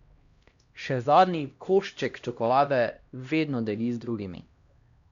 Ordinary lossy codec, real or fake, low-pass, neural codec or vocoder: Opus, 64 kbps; fake; 7.2 kHz; codec, 16 kHz, 1 kbps, X-Codec, HuBERT features, trained on LibriSpeech